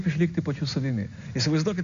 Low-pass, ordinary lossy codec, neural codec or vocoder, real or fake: 7.2 kHz; Opus, 64 kbps; none; real